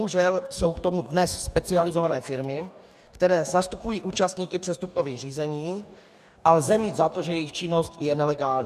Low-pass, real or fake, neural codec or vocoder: 14.4 kHz; fake; codec, 44.1 kHz, 2.6 kbps, DAC